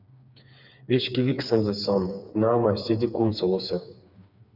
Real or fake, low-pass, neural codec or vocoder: fake; 5.4 kHz; codec, 16 kHz, 4 kbps, FreqCodec, smaller model